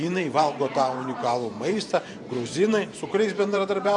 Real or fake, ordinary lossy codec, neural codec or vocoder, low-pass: fake; MP3, 64 kbps; vocoder, 44.1 kHz, 128 mel bands every 512 samples, BigVGAN v2; 10.8 kHz